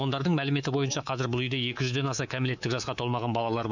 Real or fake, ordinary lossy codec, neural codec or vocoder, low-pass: fake; none; codec, 24 kHz, 3.1 kbps, DualCodec; 7.2 kHz